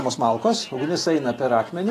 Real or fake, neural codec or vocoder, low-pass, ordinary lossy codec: real; none; 14.4 kHz; AAC, 48 kbps